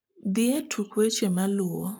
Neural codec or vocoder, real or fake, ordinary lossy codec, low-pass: codec, 44.1 kHz, 7.8 kbps, Pupu-Codec; fake; none; none